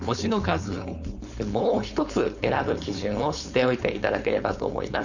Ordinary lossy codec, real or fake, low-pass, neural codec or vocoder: none; fake; 7.2 kHz; codec, 16 kHz, 4.8 kbps, FACodec